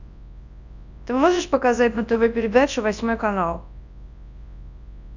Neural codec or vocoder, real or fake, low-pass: codec, 24 kHz, 0.9 kbps, WavTokenizer, large speech release; fake; 7.2 kHz